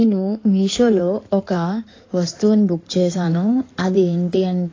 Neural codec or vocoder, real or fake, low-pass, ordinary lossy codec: codec, 16 kHz in and 24 kHz out, 2.2 kbps, FireRedTTS-2 codec; fake; 7.2 kHz; AAC, 32 kbps